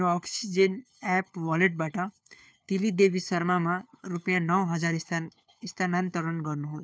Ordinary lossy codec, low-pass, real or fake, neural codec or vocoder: none; none; fake; codec, 16 kHz, 4 kbps, FunCodec, trained on LibriTTS, 50 frames a second